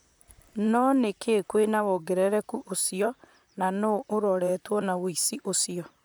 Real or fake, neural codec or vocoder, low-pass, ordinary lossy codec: fake; vocoder, 44.1 kHz, 128 mel bands, Pupu-Vocoder; none; none